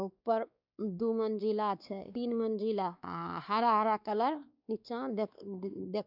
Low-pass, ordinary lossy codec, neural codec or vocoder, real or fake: 5.4 kHz; none; codec, 16 kHz, 2 kbps, X-Codec, WavLM features, trained on Multilingual LibriSpeech; fake